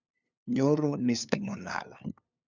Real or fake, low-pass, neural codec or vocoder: fake; 7.2 kHz; codec, 16 kHz, 2 kbps, FunCodec, trained on LibriTTS, 25 frames a second